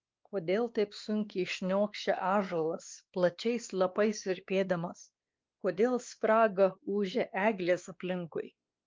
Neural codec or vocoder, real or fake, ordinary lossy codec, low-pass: codec, 16 kHz, 2 kbps, X-Codec, WavLM features, trained on Multilingual LibriSpeech; fake; Opus, 24 kbps; 7.2 kHz